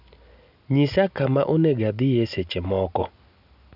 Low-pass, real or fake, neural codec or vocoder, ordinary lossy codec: 5.4 kHz; real; none; none